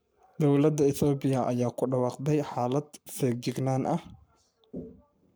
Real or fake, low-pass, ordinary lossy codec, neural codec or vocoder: fake; none; none; codec, 44.1 kHz, 7.8 kbps, Pupu-Codec